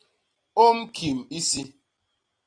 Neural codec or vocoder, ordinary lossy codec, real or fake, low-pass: none; AAC, 32 kbps; real; 9.9 kHz